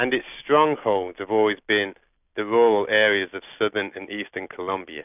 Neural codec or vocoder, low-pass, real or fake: none; 3.6 kHz; real